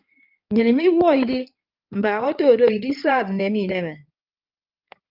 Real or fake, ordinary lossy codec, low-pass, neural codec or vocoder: fake; Opus, 24 kbps; 5.4 kHz; codec, 16 kHz in and 24 kHz out, 2.2 kbps, FireRedTTS-2 codec